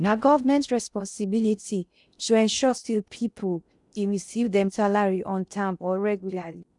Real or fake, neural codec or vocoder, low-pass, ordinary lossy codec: fake; codec, 16 kHz in and 24 kHz out, 0.6 kbps, FocalCodec, streaming, 4096 codes; 10.8 kHz; none